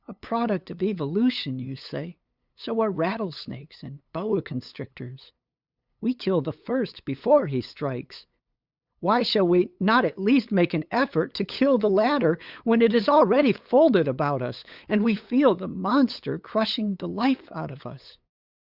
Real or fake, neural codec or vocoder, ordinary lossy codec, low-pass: fake; codec, 16 kHz, 8 kbps, FunCodec, trained on LibriTTS, 25 frames a second; Opus, 64 kbps; 5.4 kHz